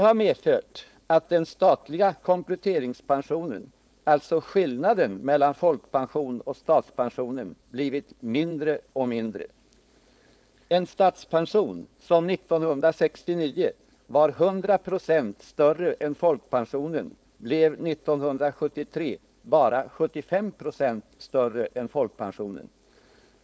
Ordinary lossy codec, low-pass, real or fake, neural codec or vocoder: none; none; fake; codec, 16 kHz, 4.8 kbps, FACodec